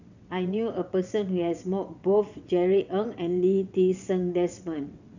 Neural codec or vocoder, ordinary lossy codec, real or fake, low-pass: vocoder, 22.05 kHz, 80 mel bands, WaveNeXt; none; fake; 7.2 kHz